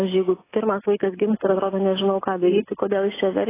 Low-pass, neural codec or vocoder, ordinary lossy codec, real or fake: 3.6 kHz; none; AAC, 16 kbps; real